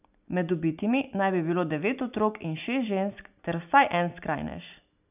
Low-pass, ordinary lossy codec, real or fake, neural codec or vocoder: 3.6 kHz; none; real; none